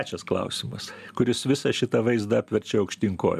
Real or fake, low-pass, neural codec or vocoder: real; 14.4 kHz; none